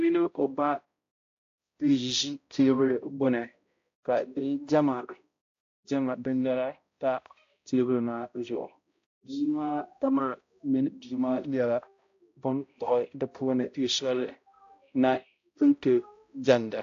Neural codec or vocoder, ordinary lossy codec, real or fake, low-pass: codec, 16 kHz, 0.5 kbps, X-Codec, HuBERT features, trained on balanced general audio; AAC, 48 kbps; fake; 7.2 kHz